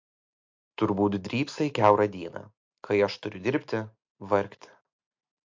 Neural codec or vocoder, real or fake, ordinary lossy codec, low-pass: none; real; MP3, 48 kbps; 7.2 kHz